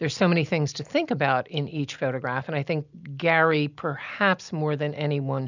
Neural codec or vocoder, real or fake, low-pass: none; real; 7.2 kHz